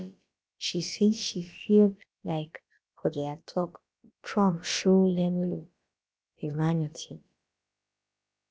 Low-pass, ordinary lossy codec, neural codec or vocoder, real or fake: none; none; codec, 16 kHz, about 1 kbps, DyCAST, with the encoder's durations; fake